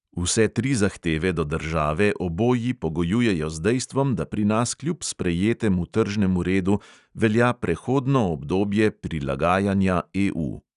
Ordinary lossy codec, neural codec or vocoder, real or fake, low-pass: none; none; real; 10.8 kHz